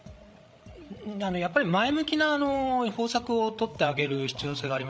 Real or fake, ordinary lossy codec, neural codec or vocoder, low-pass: fake; none; codec, 16 kHz, 16 kbps, FreqCodec, larger model; none